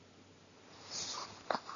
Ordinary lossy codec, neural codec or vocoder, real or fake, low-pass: MP3, 96 kbps; none; real; 7.2 kHz